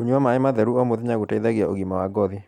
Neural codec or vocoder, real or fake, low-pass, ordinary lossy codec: none; real; 19.8 kHz; none